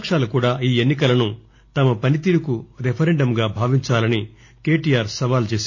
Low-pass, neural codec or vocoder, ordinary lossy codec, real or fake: 7.2 kHz; none; AAC, 48 kbps; real